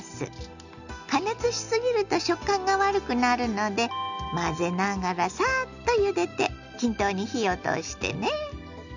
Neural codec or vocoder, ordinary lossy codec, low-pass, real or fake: none; none; 7.2 kHz; real